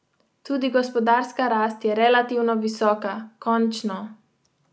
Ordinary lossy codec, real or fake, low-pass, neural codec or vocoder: none; real; none; none